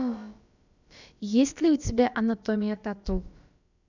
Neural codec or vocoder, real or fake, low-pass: codec, 16 kHz, about 1 kbps, DyCAST, with the encoder's durations; fake; 7.2 kHz